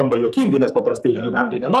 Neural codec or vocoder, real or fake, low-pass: codec, 44.1 kHz, 2.6 kbps, SNAC; fake; 14.4 kHz